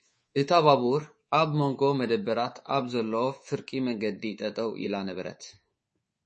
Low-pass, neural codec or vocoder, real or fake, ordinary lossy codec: 10.8 kHz; codec, 24 kHz, 3.1 kbps, DualCodec; fake; MP3, 32 kbps